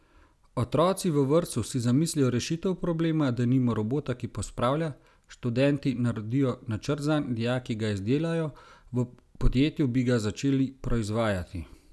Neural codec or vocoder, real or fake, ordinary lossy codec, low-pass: none; real; none; none